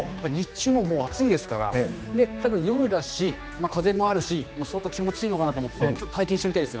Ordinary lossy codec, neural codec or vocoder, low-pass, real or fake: none; codec, 16 kHz, 2 kbps, X-Codec, HuBERT features, trained on general audio; none; fake